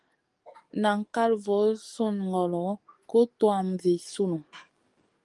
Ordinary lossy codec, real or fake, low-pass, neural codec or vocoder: Opus, 32 kbps; fake; 10.8 kHz; codec, 44.1 kHz, 7.8 kbps, DAC